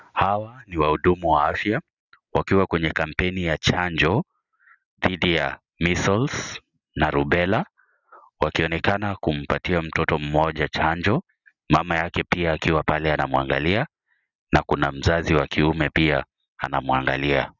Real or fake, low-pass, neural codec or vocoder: real; 7.2 kHz; none